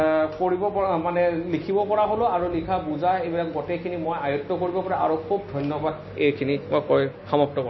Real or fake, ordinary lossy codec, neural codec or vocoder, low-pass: real; MP3, 24 kbps; none; 7.2 kHz